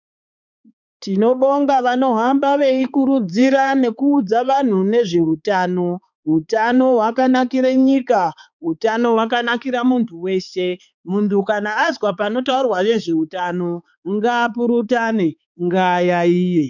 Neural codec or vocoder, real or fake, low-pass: codec, 16 kHz, 4 kbps, X-Codec, HuBERT features, trained on balanced general audio; fake; 7.2 kHz